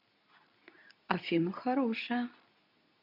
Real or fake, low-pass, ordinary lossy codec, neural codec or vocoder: fake; 5.4 kHz; none; codec, 24 kHz, 0.9 kbps, WavTokenizer, medium speech release version 2